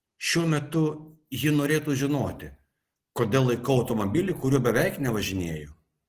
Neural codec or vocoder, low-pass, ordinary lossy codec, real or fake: none; 14.4 kHz; Opus, 16 kbps; real